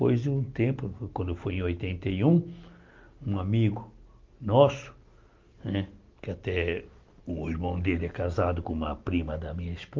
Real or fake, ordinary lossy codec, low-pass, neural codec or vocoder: real; Opus, 24 kbps; 7.2 kHz; none